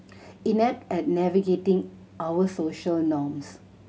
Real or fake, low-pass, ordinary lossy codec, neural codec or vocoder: real; none; none; none